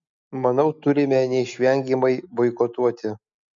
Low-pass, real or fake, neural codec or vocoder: 7.2 kHz; real; none